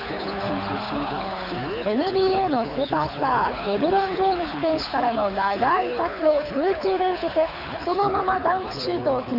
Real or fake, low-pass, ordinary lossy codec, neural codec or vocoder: fake; 5.4 kHz; none; codec, 24 kHz, 6 kbps, HILCodec